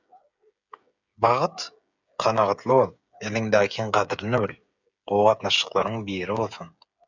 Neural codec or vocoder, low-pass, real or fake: codec, 16 kHz, 8 kbps, FreqCodec, smaller model; 7.2 kHz; fake